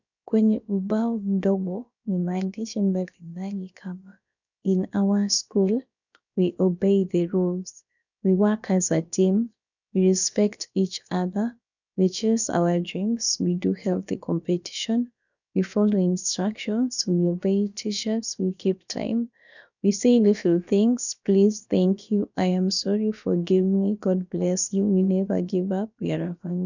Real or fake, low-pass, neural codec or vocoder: fake; 7.2 kHz; codec, 16 kHz, about 1 kbps, DyCAST, with the encoder's durations